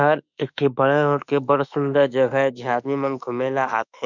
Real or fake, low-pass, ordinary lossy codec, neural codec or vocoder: fake; 7.2 kHz; none; autoencoder, 48 kHz, 32 numbers a frame, DAC-VAE, trained on Japanese speech